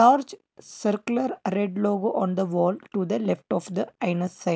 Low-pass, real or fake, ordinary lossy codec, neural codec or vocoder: none; real; none; none